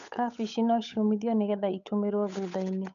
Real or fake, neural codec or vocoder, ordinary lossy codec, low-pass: fake; codec, 16 kHz, 8 kbps, FunCodec, trained on Chinese and English, 25 frames a second; none; 7.2 kHz